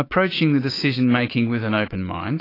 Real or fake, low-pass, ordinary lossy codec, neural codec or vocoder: fake; 5.4 kHz; AAC, 24 kbps; autoencoder, 48 kHz, 128 numbers a frame, DAC-VAE, trained on Japanese speech